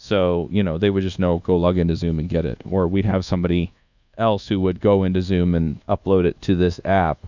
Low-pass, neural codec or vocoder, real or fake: 7.2 kHz; codec, 24 kHz, 1.2 kbps, DualCodec; fake